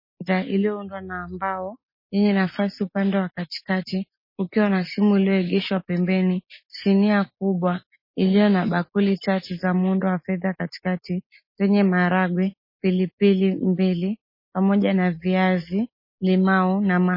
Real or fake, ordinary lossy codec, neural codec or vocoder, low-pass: real; MP3, 24 kbps; none; 5.4 kHz